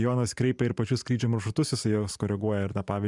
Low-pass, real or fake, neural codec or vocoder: 10.8 kHz; fake; vocoder, 44.1 kHz, 128 mel bands every 512 samples, BigVGAN v2